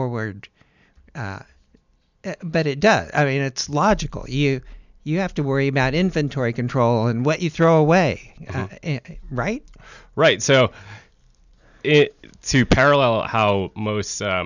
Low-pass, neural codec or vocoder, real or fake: 7.2 kHz; none; real